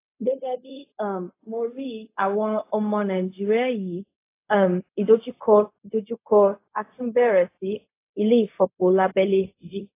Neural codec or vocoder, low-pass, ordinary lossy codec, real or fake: codec, 16 kHz, 0.4 kbps, LongCat-Audio-Codec; 3.6 kHz; AAC, 24 kbps; fake